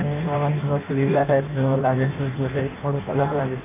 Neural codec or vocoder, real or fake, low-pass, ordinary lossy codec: codec, 16 kHz in and 24 kHz out, 0.6 kbps, FireRedTTS-2 codec; fake; 3.6 kHz; AAC, 24 kbps